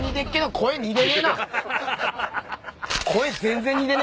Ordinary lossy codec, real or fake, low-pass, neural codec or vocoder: none; real; none; none